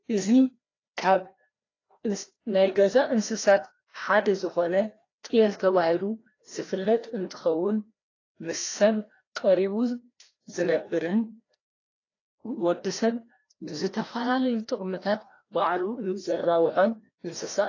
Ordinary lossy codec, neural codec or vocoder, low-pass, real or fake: AAC, 32 kbps; codec, 16 kHz, 1 kbps, FreqCodec, larger model; 7.2 kHz; fake